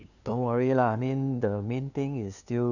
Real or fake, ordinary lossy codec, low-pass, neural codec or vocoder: fake; none; 7.2 kHz; codec, 16 kHz, 2 kbps, FunCodec, trained on LibriTTS, 25 frames a second